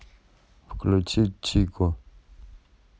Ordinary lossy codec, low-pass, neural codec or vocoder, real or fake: none; none; none; real